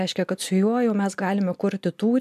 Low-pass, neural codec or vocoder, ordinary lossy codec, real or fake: 14.4 kHz; none; MP3, 96 kbps; real